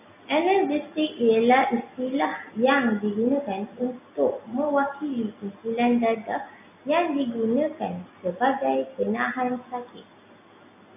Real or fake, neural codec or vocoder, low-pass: real; none; 3.6 kHz